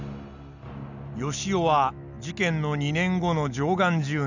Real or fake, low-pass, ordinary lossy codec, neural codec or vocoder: real; 7.2 kHz; none; none